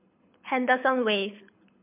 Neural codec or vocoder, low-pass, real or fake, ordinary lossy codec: codec, 24 kHz, 6 kbps, HILCodec; 3.6 kHz; fake; MP3, 32 kbps